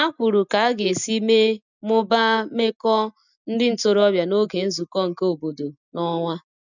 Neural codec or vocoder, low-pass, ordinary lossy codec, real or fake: none; 7.2 kHz; none; real